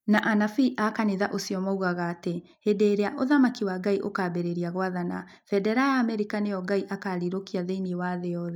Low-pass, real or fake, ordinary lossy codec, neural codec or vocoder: 19.8 kHz; real; none; none